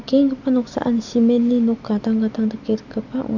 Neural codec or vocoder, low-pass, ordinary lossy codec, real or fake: none; 7.2 kHz; none; real